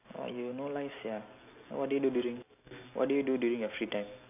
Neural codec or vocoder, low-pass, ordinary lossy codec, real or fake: none; 3.6 kHz; none; real